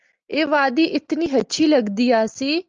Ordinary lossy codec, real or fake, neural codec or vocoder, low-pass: Opus, 24 kbps; real; none; 7.2 kHz